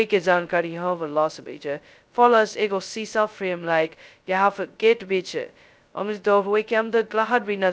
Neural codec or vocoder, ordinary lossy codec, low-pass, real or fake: codec, 16 kHz, 0.2 kbps, FocalCodec; none; none; fake